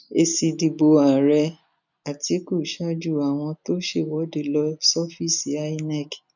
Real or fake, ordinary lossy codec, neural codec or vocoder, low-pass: real; none; none; 7.2 kHz